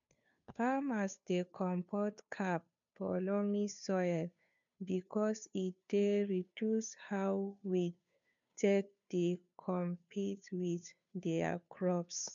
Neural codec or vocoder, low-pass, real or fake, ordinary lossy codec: codec, 16 kHz, 2 kbps, FunCodec, trained on Chinese and English, 25 frames a second; 7.2 kHz; fake; none